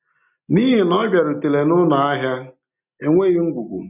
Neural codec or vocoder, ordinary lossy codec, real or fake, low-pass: none; none; real; 3.6 kHz